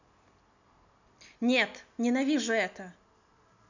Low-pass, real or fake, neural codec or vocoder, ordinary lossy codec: 7.2 kHz; real; none; none